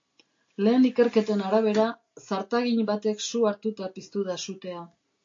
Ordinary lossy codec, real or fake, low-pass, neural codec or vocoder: AAC, 48 kbps; real; 7.2 kHz; none